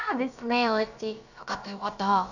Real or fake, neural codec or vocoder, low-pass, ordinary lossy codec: fake; codec, 16 kHz, about 1 kbps, DyCAST, with the encoder's durations; 7.2 kHz; none